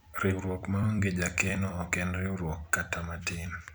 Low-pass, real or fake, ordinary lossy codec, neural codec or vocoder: none; fake; none; vocoder, 44.1 kHz, 128 mel bands every 256 samples, BigVGAN v2